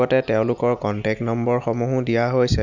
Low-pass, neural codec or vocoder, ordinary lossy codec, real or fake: 7.2 kHz; none; none; real